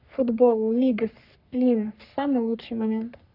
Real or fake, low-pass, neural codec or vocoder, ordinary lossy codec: fake; 5.4 kHz; codec, 44.1 kHz, 3.4 kbps, Pupu-Codec; none